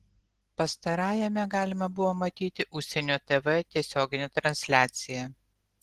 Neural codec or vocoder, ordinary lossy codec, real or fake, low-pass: none; Opus, 16 kbps; real; 14.4 kHz